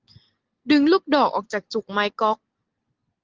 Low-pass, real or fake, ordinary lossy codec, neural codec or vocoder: 7.2 kHz; real; Opus, 16 kbps; none